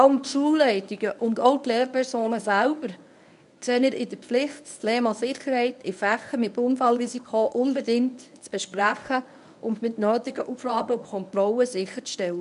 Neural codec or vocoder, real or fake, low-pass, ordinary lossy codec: codec, 24 kHz, 0.9 kbps, WavTokenizer, medium speech release version 1; fake; 10.8 kHz; none